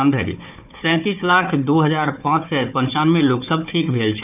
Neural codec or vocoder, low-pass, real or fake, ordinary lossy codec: codec, 16 kHz, 4 kbps, FunCodec, trained on Chinese and English, 50 frames a second; 3.6 kHz; fake; none